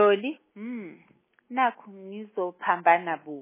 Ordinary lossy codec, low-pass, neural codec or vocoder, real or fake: MP3, 16 kbps; 3.6 kHz; none; real